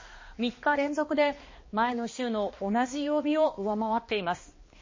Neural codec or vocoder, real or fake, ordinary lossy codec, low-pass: codec, 16 kHz, 2 kbps, X-Codec, HuBERT features, trained on balanced general audio; fake; MP3, 32 kbps; 7.2 kHz